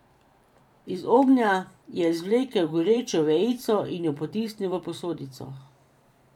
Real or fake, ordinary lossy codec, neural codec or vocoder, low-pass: fake; none; vocoder, 44.1 kHz, 128 mel bands every 512 samples, BigVGAN v2; 19.8 kHz